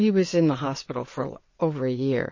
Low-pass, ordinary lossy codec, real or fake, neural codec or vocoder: 7.2 kHz; MP3, 32 kbps; fake; vocoder, 22.05 kHz, 80 mel bands, WaveNeXt